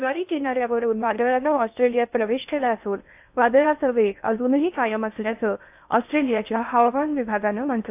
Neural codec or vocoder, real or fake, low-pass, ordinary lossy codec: codec, 16 kHz in and 24 kHz out, 0.6 kbps, FocalCodec, streaming, 2048 codes; fake; 3.6 kHz; AAC, 32 kbps